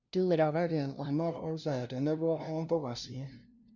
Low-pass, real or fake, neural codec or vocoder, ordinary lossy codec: 7.2 kHz; fake; codec, 16 kHz, 0.5 kbps, FunCodec, trained on LibriTTS, 25 frames a second; Opus, 64 kbps